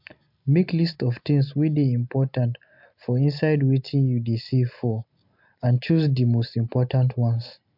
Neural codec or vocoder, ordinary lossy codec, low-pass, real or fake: none; MP3, 48 kbps; 5.4 kHz; real